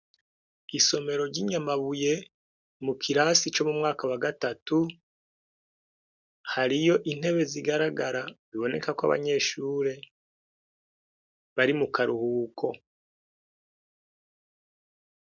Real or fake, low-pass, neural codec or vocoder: real; 7.2 kHz; none